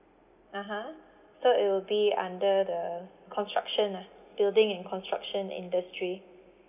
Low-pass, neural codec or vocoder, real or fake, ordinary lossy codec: 3.6 kHz; none; real; none